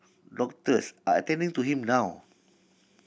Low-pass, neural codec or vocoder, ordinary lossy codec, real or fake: none; none; none; real